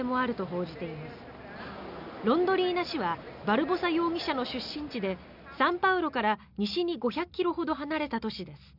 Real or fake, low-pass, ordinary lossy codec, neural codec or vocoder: real; 5.4 kHz; none; none